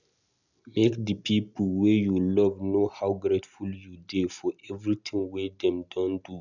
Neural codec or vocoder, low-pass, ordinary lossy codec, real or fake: none; 7.2 kHz; none; real